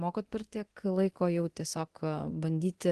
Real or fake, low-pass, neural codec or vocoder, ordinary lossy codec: fake; 10.8 kHz; codec, 24 kHz, 0.9 kbps, DualCodec; Opus, 16 kbps